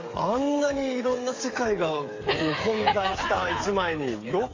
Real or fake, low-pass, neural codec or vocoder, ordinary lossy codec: fake; 7.2 kHz; codec, 16 kHz, 8 kbps, FreqCodec, smaller model; AAC, 32 kbps